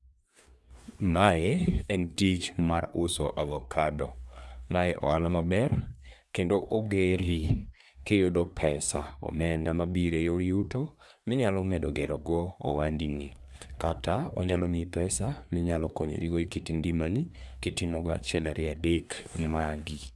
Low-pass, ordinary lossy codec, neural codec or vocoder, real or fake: none; none; codec, 24 kHz, 1 kbps, SNAC; fake